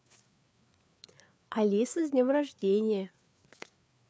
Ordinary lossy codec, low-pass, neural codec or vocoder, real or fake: none; none; codec, 16 kHz, 4 kbps, FreqCodec, larger model; fake